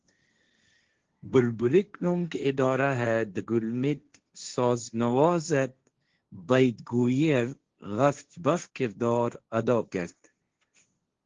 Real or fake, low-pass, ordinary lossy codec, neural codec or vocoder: fake; 7.2 kHz; Opus, 16 kbps; codec, 16 kHz, 1.1 kbps, Voila-Tokenizer